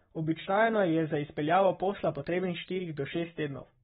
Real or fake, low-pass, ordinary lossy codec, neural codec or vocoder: fake; 19.8 kHz; AAC, 16 kbps; codec, 44.1 kHz, 7.8 kbps, Pupu-Codec